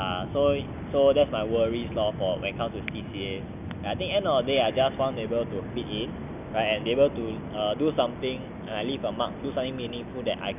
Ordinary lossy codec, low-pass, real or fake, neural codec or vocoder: none; 3.6 kHz; real; none